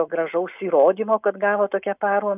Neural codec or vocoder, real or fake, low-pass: none; real; 3.6 kHz